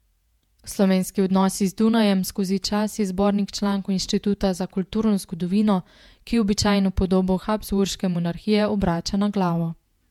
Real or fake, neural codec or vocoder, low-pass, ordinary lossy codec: fake; vocoder, 48 kHz, 128 mel bands, Vocos; 19.8 kHz; MP3, 96 kbps